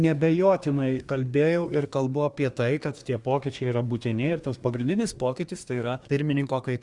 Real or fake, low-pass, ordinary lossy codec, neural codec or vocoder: fake; 10.8 kHz; Opus, 64 kbps; codec, 24 kHz, 1 kbps, SNAC